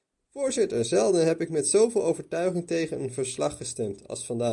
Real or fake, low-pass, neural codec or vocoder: real; 10.8 kHz; none